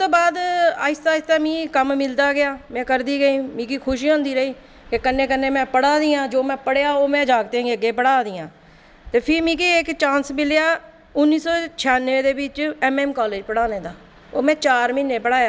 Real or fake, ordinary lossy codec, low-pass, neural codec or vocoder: real; none; none; none